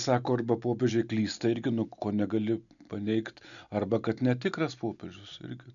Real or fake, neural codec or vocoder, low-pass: real; none; 7.2 kHz